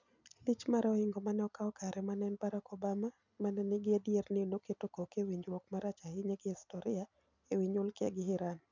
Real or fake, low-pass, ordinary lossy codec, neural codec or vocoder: real; 7.2 kHz; none; none